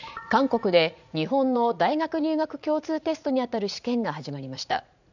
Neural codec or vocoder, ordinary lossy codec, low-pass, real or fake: vocoder, 44.1 kHz, 80 mel bands, Vocos; none; 7.2 kHz; fake